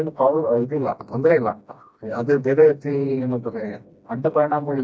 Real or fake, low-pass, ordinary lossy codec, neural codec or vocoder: fake; none; none; codec, 16 kHz, 1 kbps, FreqCodec, smaller model